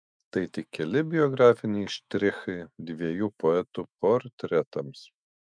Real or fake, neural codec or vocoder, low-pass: fake; autoencoder, 48 kHz, 128 numbers a frame, DAC-VAE, trained on Japanese speech; 9.9 kHz